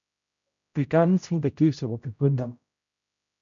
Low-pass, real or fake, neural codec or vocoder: 7.2 kHz; fake; codec, 16 kHz, 0.5 kbps, X-Codec, HuBERT features, trained on general audio